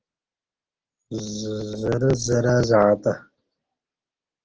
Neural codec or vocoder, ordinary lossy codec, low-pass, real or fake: none; Opus, 16 kbps; 7.2 kHz; real